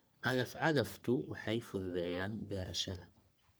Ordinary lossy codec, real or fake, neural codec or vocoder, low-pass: none; fake; codec, 44.1 kHz, 3.4 kbps, Pupu-Codec; none